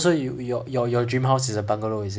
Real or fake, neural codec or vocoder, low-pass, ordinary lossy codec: real; none; none; none